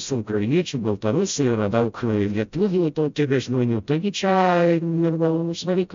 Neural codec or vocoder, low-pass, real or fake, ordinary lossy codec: codec, 16 kHz, 0.5 kbps, FreqCodec, smaller model; 7.2 kHz; fake; MP3, 64 kbps